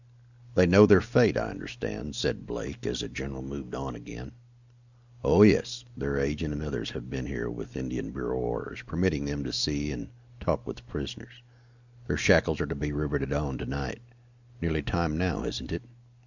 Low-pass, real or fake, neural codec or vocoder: 7.2 kHz; real; none